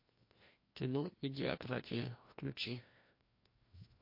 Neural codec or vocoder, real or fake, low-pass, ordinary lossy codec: codec, 16 kHz, 1 kbps, FreqCodec, larger model; fake; 5.4 kHz; MP3, 32 kbps